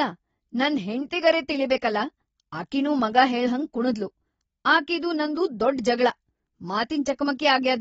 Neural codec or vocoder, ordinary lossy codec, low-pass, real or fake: none; AAC, 24 kbps; 19.8 kHz; real